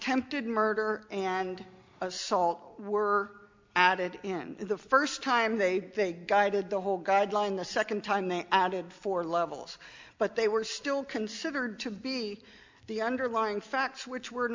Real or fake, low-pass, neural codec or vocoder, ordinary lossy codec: real; 7.2 kHz; none; MP3, 64 kbps